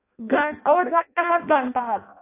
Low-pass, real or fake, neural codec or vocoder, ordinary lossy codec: 3.6 kHz; fake; codec, 16 kHz in and 24 kHz out, 0.6 kbps, FireRedTTS-2 codec; AAC, 24 kbps